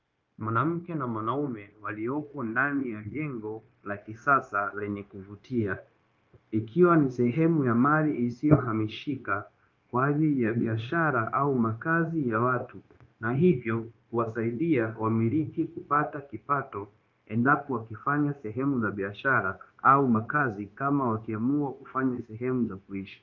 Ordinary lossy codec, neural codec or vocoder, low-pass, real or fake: Opus, 24 kbps; codec, 16 kHz, 0.9 kbps, LongCat-Audio-Codec; 7.2 kHz; fake